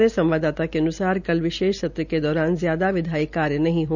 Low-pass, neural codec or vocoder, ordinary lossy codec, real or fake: 7.2 kHz; none; none; real